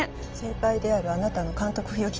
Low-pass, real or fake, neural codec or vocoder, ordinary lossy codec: 7.2 kHz; real; none; Opus, 24 kbps